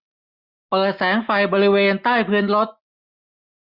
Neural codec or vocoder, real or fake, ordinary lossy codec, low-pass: none; real; Opus, 64 kbps; 5.4 kHz